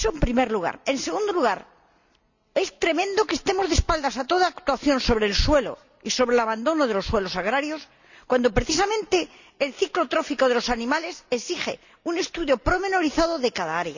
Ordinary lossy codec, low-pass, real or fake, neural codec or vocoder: none; 7.2 kHz; real; none